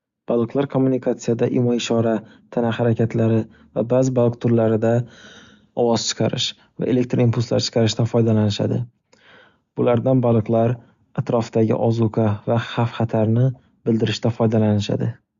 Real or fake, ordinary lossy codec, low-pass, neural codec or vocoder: real; Opus, 64 kbps; 7.2 kHz; none